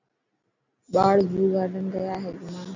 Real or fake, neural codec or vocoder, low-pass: real; none; 7.2 kHz